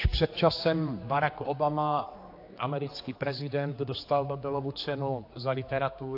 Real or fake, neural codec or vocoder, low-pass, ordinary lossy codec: fake; codec, 16 kHz, 4 kbps, X-Codec, HuBERT features, trained on general audio; 5.4 kHz; AAC, 32 kbps